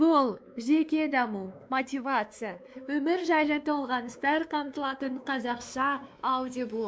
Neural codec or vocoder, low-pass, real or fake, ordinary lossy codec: codec, 16 kHz, 2 kbps, X-Codec, WavLM features, trained on Multilingual LibriSpeech; none; fake; none